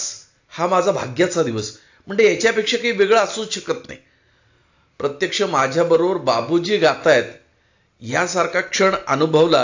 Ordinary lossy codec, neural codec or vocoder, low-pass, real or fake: AAC, 48 kbps; none; 7.2 kHz; real